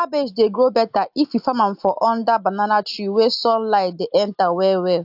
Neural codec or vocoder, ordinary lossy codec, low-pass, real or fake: none; AAC, 48 kbps; 5.4 kHz; real